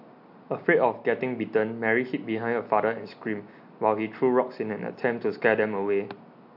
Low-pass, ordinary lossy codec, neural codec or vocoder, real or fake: 5.4 kHz; MP3, 48 kbps; none; real